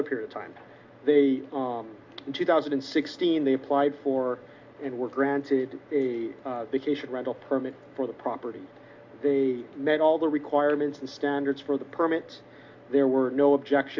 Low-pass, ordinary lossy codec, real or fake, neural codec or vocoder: 7.2 kHz; MP3, 64 kbps; real; none